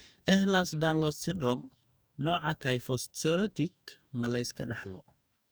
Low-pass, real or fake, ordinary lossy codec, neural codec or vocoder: none; fake; none; codec, 44.1 kHz, 2.6 kbps, DAC